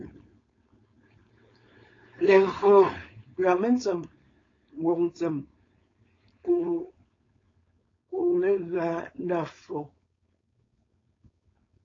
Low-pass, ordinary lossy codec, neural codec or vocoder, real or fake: 7.2 kHz; AAC, 32 kbps; codec, 16 kHz, 4.8 kbps, FACodec; fake